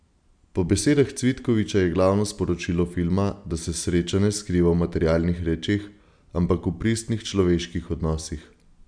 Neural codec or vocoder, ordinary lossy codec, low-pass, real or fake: none; none; 9.9 kHz; real